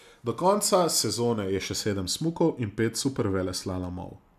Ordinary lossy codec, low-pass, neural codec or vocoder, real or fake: none; 14.4 kHz; none; real